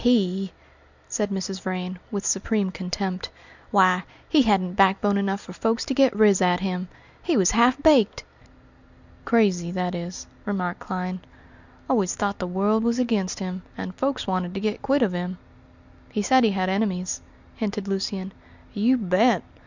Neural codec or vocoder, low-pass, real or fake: none; 7.2 kHz; real